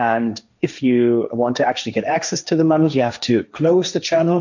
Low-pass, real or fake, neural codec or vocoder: 7.2 kHz; fake; codec, 16 kHz, 1.1 kbps, Voila-Tokenizer